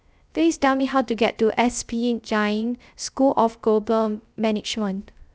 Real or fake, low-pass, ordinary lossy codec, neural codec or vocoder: fake; none; none; codec, 16 kHz, 0.3 kbps, FocalCodec